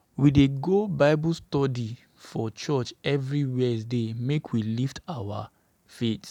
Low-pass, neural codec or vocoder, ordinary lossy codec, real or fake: 19.8 kHz; none; none; real